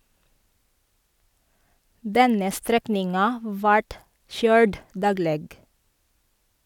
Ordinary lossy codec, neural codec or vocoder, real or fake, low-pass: none; none; real; 19.8 kHz